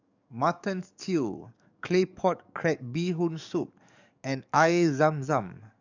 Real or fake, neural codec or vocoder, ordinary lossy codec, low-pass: fake; codec, 44.1 kHz, 7.8 kbps, DAC; none; 7.2 kHz